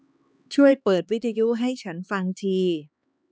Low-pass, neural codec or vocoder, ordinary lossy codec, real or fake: none; codec, 16 kHz, 2 kbps, X-Codec, HuBERT features, trained on LibriSpeech; none; fake